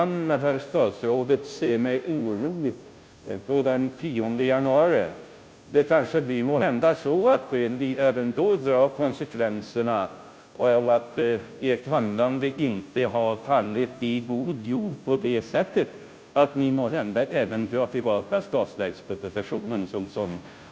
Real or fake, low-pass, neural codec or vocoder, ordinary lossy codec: fake; none; codec, 16 kHz, 0.5 kbps, FunCodec, trained on Chinese and English, 25 frames a second; none